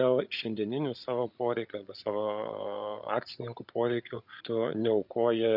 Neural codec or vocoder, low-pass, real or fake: codec, 16 kHz, 8 kbps, FreqCodec, larger model; 5.4 kHz; fake